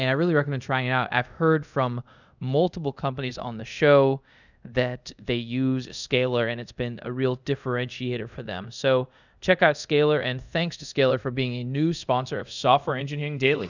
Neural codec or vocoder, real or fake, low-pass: codec, 24 kHz, 0.5 kbps, DualCodec; fake; 7.2 kHz